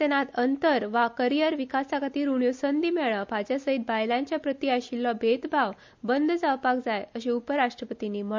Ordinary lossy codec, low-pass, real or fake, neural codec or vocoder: MP3, 64 kbps; 7.2 kHz; real; none